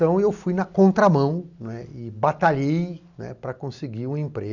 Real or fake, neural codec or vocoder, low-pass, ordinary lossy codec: real; none; 7.2 kHz; none